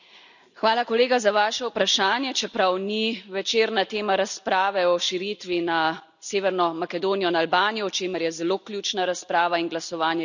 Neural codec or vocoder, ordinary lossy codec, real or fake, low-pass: none; none; real; 7.2 kHz